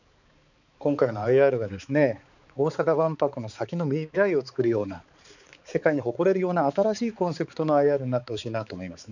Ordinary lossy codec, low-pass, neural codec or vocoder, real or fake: none; 7.2 kHz; codec, 16 kHz, 4 kbps, X-Codec, HuBERT features, trained on balanced general audio; fake